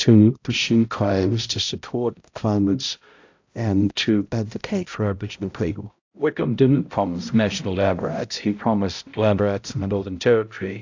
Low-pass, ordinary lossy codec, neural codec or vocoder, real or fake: 7.2 kHz; AAC, 48 kbps; codec, 16 kHz, 0.5 kbps, X-Codec, HuBERT features, trained on balanced general audio; fake